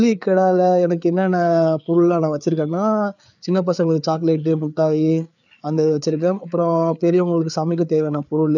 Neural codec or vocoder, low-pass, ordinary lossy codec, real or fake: codec, 16 kHz, 4 kbps, FreqCodec, larger model; 7.2 kHz; none; fake